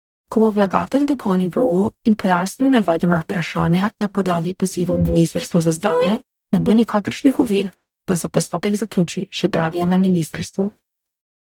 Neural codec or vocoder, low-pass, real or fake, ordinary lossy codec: codec, 44.1 kHz, 0.9 kbps, DAC; 19.8 kHz; fake; none